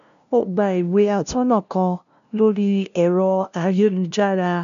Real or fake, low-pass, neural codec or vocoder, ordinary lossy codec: fake; 7.2 kHz; codec, 16 kHz, 0.5 kbps, FunCodec, trained on LibriTTS, 25 frames a second; none